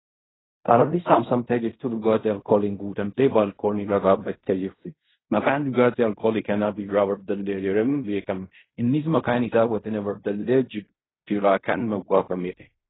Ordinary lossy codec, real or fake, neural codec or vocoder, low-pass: AAC, 16 kbps; fake; codec, 16 kHz in and 24 kHz out, 0.4 kbps, LongCat-Audio-Codec, fine tuned four codebook decoder; 7.2 kHz